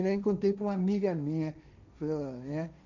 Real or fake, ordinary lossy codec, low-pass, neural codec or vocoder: fake; none; 7.2 kHz; codec, 16 kHz, 1.1 kbps, Voila-Tokenizer